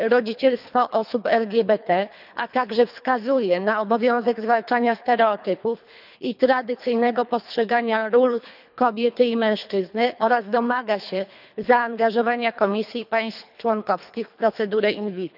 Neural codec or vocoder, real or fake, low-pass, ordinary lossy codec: codec, 24 kHz, 3 kbps, HILCodec; fake; 5.4 kHz; none